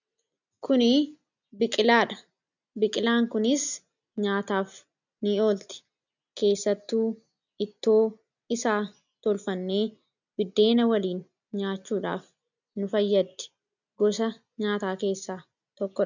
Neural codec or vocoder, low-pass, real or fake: none; 7.2 kHz; real